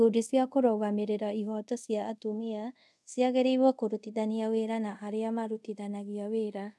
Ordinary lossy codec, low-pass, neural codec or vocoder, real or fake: none; none; codec, 24 kHz, 0.5 kbps, DualCodec; fake